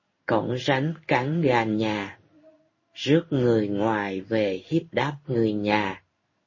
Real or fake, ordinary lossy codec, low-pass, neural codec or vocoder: fake; MP3, 32 kbps; 7.2 kHz; codec, 16 kHz in and 24 kHz out, 1 kbps, XY-Tokenizer